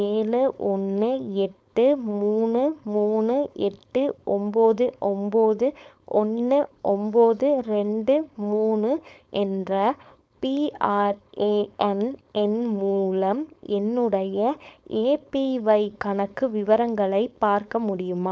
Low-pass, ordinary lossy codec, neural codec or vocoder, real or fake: none; none; codec, 16 kHz, 4.8 kbps, FACodec; fake